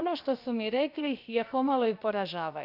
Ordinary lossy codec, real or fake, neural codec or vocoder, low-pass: none; fake; codec, 16 kHz, about 1 kbps, DyCAST, with the encoder's durations; 5.4 kHz